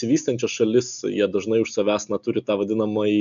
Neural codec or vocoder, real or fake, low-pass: none; real; 7.2 kHz